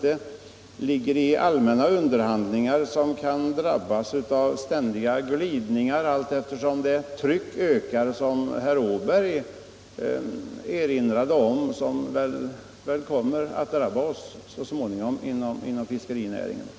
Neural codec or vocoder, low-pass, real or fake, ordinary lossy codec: none; none; real; none